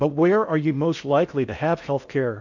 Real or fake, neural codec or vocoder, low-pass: fake; codec, 16 kHz in and 24 kHz out, 0.6 kbps, FocalCodec, streaming, 4096 codes; 7.2 kHz